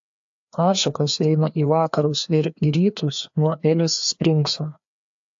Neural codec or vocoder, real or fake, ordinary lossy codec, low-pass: codec, 16 kHz, 2 kbps, FreqCodec, larger model; fake; AAC, 64 kbps; 7.2 kHz